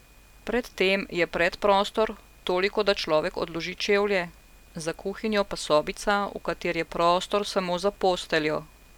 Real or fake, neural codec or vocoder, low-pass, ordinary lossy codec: real; none; 19.8 kHz; none